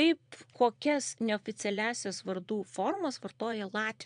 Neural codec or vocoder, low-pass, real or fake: vocoder, 22.05 kHz, 80 mel bands, Vocos; 9.9 kHz; fake